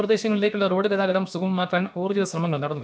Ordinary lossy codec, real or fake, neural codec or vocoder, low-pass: none; fake; codec, 16 kHz, about 1 kbps, DyCAST, with the encoder's durations; none